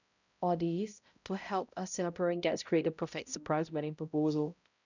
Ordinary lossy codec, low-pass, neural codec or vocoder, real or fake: none; 7.2 kHz; codec, 16 kHz, 0.5 kbps, X-Codec, HuBERT features, trained on balanced general audio; fake